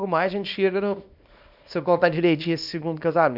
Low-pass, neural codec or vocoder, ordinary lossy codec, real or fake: 5.4 kHz; codec, 24 kHz, 0.9 kbps, WavTokenizer, small release; none; fake